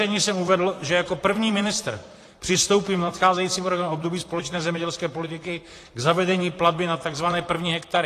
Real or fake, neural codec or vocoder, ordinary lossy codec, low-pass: fake; vocoder, 44.1 kHz, 128 mel bands, Pupu-Vocoder; AAC, 48 kbps; 14.4 kHz